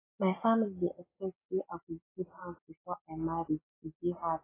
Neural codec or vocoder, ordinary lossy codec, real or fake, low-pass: none; AAC, 16 kbps; real; 3.6 kHz